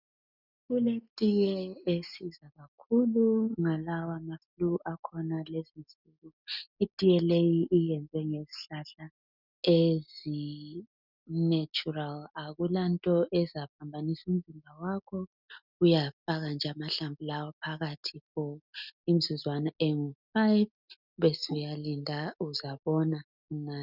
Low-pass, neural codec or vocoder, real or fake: 5.4 kHz; none; real